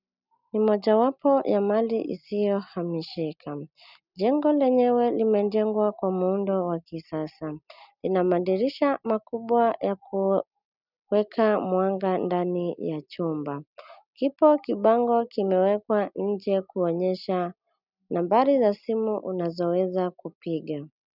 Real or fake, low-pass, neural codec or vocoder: real; 5.4 kHz; none